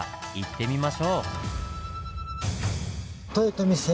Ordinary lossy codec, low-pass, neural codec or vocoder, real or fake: none; none; none; real